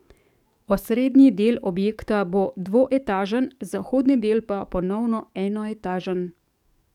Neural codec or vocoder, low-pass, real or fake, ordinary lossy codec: codec, 44.1 kHz, 7.8 kbps, DAC; 19.8 kHz; fake; none